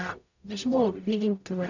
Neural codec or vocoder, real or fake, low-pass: codec, 44.1 kHz, 0.9 kbps, DAC; fake; 7.2 kHz